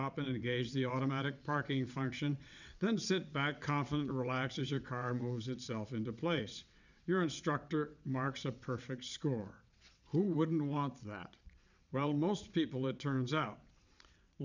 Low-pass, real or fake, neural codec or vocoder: 7.2 kHz; fake; vocoder, 22.05 kHz, 80 mel bands, WaveNeXt